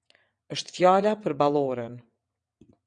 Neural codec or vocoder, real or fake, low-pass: vocoder, 22.05 kHz, 80 mel bands, WaveNeXt; fake; 9.9 kHz